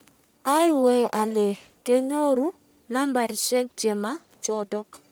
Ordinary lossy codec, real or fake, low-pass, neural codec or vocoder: none; fake; none; codec, 44.1 kHz, 1.7 kbps, Pupu-Codec